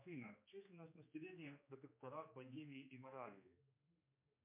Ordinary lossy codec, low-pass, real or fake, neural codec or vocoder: MP3, 32 kbps; 3.6 kHz; fake; codec, 16 kHz, 2 kbps, X-Codec, HuBERT features, trained on general audio